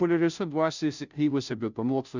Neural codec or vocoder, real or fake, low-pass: codec, 16 kHz, 0.5 kbps, FunCodec, trained on Chinese and English, 25 frames a second; fake; 7.2 kHz